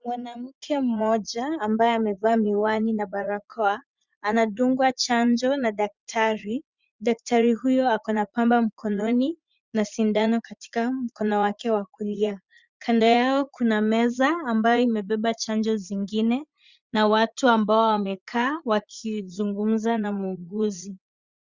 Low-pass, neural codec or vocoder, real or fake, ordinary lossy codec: 7.2 kHz; vocoder, 44.1 kHz, 80 mel bands, Vocos; fake; Opus, 64 kbps